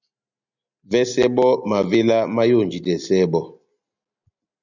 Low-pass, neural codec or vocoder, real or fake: 7.2 kHz; none; real